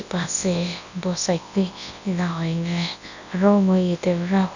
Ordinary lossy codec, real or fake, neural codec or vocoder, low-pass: none; fake; codec, 24 kHz, 0.9 kbps, WavTokenizer, large speech release; 7.2 kHz